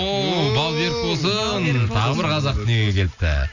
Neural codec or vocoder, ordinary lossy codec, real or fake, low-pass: none; none; real; 7.2 kHz